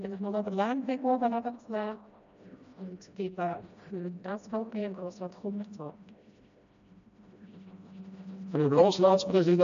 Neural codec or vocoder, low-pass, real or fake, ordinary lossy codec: codec, 16 kHz, 1 kbps, FreqCodec, smaller model; 7.2 kHz; fake; MP3, 96 kbps